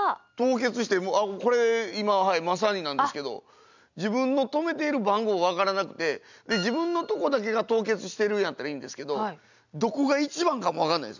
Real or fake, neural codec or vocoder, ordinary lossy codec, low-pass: real; none; none; 7.2 kHz